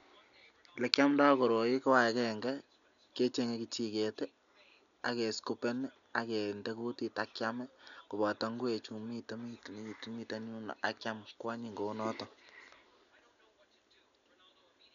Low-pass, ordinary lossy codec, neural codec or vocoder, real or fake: 7.2 kHz; none; none; real